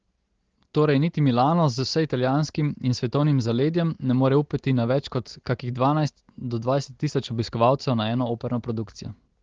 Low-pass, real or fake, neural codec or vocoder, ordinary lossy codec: 7.2 kHz; real; none; Opus, 16 kbps